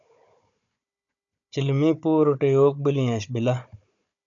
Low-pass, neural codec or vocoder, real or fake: 7.2 kHz; codec, 16 kHz, 16 kbps, FunCodec, trained on Chinese and English, 50 frames a second; fake